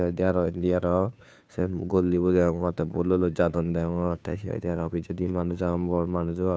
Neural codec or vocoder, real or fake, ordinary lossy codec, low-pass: codec, 16 kHz, 2 kbps, FunCodec, trained on Chinese and English, 25 frames a second; fake; none; none